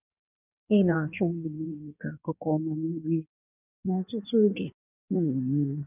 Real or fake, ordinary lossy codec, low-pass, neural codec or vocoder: fake; none; 3.6 kHz; codec, 16 kHz in and 24 kHz out, 1.1 kbps, FireRedTTS-2 codec